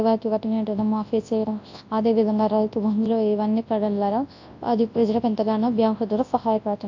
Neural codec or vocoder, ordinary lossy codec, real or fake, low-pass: codec, 24 kHz, 0.9 kbps, WavTokenizer, large speech release; AAC, 48 kbps; fake; 7.2 kHz